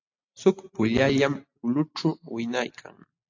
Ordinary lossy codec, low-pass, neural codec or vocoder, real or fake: AAC, 48 kbps; 7.2 kHz; none; real